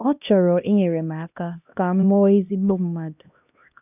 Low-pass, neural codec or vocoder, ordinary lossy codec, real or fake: 3.6 kHz; codec, 16 kHz, 1 kbps, X-Codec, HuBERT features, trained on LibriSpeech; none; fake